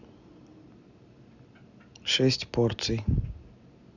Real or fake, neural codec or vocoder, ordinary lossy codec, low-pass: real; none; none; 7.2 kHz